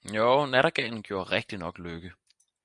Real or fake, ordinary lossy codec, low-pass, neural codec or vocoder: real; MP3, 96 kbps; 10.8 kHz; none